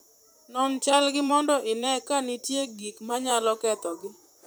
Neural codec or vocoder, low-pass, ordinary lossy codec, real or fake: vocoder, 44.1 kHz, 128 mel bands, Pupu-Vocoder; none; none; fake